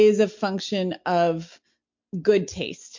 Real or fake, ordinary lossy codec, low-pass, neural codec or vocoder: real; MP3, 48 kbps; 7.2 kHz; none